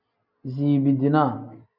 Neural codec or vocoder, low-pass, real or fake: none; 5.4 kHz; real